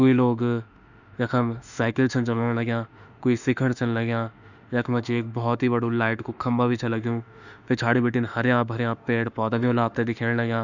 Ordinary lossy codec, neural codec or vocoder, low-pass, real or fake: none; autoencoder, 48 kHz, 32 numbers a frame, DAC-VAE, trained on Japanese speech; 7.2 kHz; fake